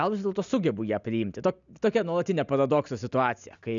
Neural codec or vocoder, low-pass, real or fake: none; 7.2 kHz; real